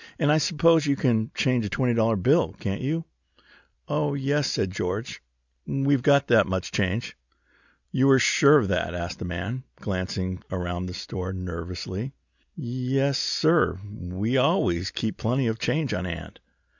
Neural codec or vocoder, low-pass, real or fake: none; 7.2 kHz; real